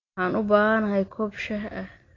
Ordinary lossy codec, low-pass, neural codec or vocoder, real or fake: AAC, 32 kbps; 7.2 kHz; none; real